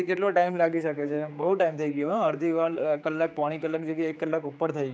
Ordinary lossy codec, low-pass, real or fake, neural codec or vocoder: none; none; fake; codec, 16 kHz, 4 kbps, X-Codec, HuBERT features, trained on general audio